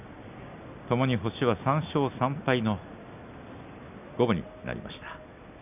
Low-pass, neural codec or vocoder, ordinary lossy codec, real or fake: 3.6 kHz; none; none; real